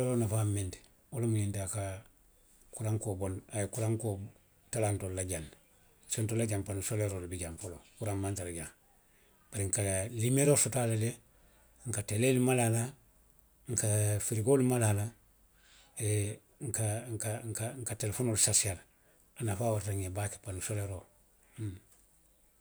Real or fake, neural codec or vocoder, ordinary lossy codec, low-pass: real; none; none; none